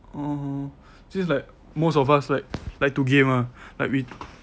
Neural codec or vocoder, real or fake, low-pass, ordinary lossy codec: none; real; none; none